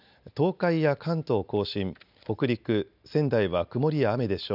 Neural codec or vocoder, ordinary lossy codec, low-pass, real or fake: none; none; 5.4 kHz; real